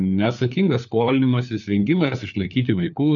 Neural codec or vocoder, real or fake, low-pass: codec, 16 kHz, 4 kbps, FunCodec, trained on LibriTTS, 50 frames a second; fake; 7.2 kHz